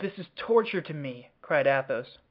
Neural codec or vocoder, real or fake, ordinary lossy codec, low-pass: none; real; MP3, 48 kbps; 5.4 kHz